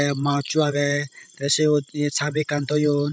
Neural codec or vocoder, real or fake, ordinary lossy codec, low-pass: codec, 16 kHz, 16 kbps, FreqCodec, larger model; fake; none; none